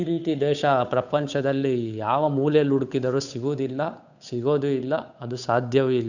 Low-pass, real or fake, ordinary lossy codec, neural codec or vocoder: 7.2 kHz; fake; none; codec, 16 kHz, 8 kbps, FunCodec, trained on Chinese and English, 25 frames a second